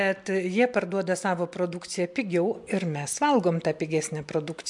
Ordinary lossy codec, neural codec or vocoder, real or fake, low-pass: MP3, 64 kbps; none; real; 10.8 kHz